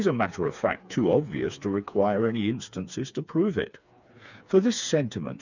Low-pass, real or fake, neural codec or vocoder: 7.2 kHz; fake; codec, 16 kHz, 4 kbps, FreqCodec, smaller model